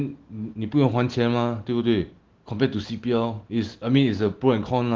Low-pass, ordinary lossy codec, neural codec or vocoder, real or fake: 7.2 kHz; Opus, 16 kbps; none; real